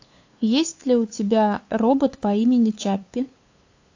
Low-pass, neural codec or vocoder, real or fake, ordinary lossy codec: 7.2 kHz; codec, 16 kHz, 8 kbps, FunCodec, trained on LibriTTS, 25 frames a second; fake; AAC, 48 kbps